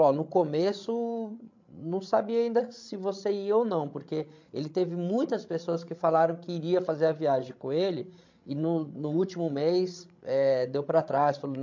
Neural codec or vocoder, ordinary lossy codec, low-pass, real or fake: codec, 16 kHz, 16 kbps, FreqCodec, larger model; MP3, 48 kbps; 7.2 kHz; fake